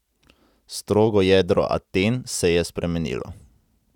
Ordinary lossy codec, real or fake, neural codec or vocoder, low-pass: none; real; none; 19.8 kHz